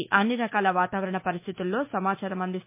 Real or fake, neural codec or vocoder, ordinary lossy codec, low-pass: fake; autoencoder, 48 kHz, 128 numbers a frame, DAC-VAE, trained on Japanese speech; MP3, 24 kbps; 3.6 kHz